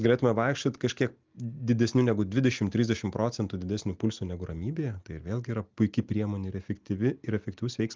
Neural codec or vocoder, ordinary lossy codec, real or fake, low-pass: none; Opus, 24 kbps; real; 7.2 kHz